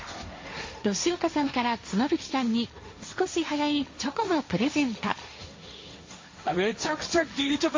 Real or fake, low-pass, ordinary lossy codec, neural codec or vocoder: fake; 7.2 kHz; MP3, 32 kbps; codec, 16 kHz, 1.1 kbps, Voila-Tokenizer